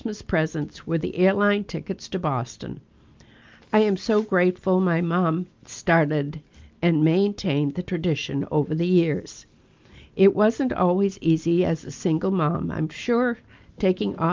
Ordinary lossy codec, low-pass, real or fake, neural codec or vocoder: Opus, 32 kbps; 7.2 kHz; real; none